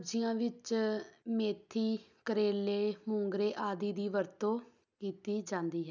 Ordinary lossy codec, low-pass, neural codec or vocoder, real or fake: none; 7.2 kHz; none; real